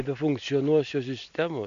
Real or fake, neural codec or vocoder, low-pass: real; none; 7.2 kHz